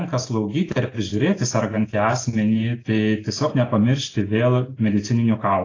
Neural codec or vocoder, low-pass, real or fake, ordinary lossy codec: none; 7.2 kHz; real; AAC, 32 kbps